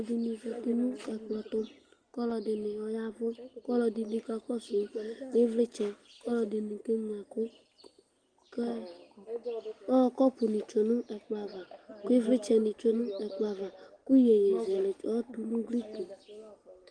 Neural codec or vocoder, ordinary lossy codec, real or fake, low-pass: none; Opus, 24 kbps; real; 9.9 kHz